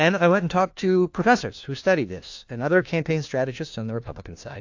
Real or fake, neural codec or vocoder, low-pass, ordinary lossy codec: fake; codec, 16 kHz, 1 kbps, FunCodec, trained on LibriTTS, 50 frames a second; 7.2 kHz; AAC, 48 kbps